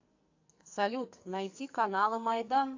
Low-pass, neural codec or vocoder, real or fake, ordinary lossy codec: 7.2 kHz; codec, 44.1 kHz, 2.6 kbps, SNAC; fake; AAC, 48 kbps